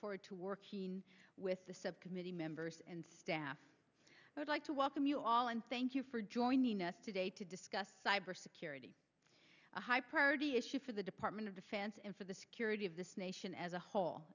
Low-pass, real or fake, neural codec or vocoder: 7.2 kHz; real; none